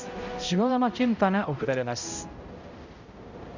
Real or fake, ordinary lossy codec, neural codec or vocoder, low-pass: fake; Opus, 64 kbps; codec, 16 kHz, 0.5 kbps, X-Codec, HuBERT features, trained on balanced general audio; 7.2 kHz